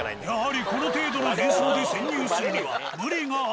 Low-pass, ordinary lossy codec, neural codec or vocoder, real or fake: none; none; none; real